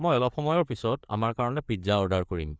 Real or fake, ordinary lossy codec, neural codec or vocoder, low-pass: fake; none; codec, 16 kHz, 4 kbps, FreqCodec, larger model; none